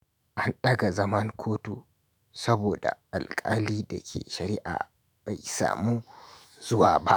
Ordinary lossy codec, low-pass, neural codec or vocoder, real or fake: none; none; autoencoder, 48 kHz, 128 numbers a frame, DAC-VAE, trained on Japanese speech; fake